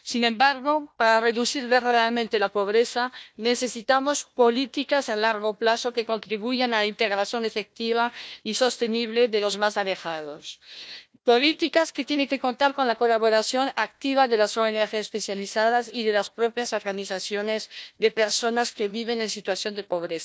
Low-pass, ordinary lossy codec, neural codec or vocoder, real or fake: none; none; codec, 16 kHz, 1 kbps, FunCodec, trained on Chinese and English, 50 frames a second; fake